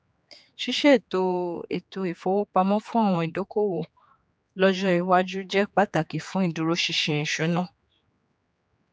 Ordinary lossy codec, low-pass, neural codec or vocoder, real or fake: none; none; codec, 16 kHz, 4 kbps, X-Codec, HuBERT features, trained on general audio; fake